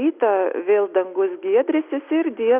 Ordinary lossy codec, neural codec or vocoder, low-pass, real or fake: Opus, 64 kbps; none; 3.6 kHz; real